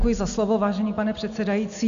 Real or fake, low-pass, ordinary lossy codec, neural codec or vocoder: real; 7.2 kHz; MP3, 96 kbps; none